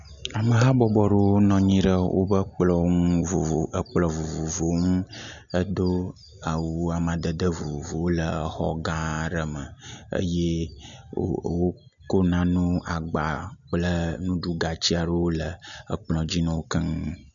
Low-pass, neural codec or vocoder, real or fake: 7.2 kHz; none; real